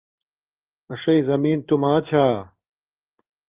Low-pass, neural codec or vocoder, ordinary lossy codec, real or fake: 3.6 kHz; codec, 16 kHz in and 24 kHz out, 1 kbps, XY-Tokenizer; Opus, 32 kbps; fake